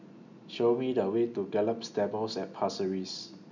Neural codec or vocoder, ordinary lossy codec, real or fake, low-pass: none; none; real; 7.2 kHz